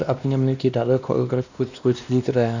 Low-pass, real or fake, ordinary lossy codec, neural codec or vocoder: 7.2 kHz; fake; none; codec, 16 kHz, 1 kbps, X-Codec, WavLM features, trained on Multilingual LibriSpeech